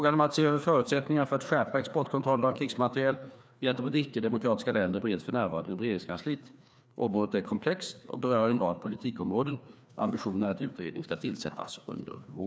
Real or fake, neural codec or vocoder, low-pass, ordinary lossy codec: fake; codec, 16 kHz, 2 kbps, FreqCodec, larger model; none; none